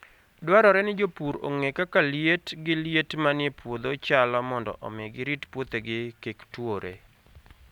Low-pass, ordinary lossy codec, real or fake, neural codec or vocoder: 19.8 kHz; none; real; none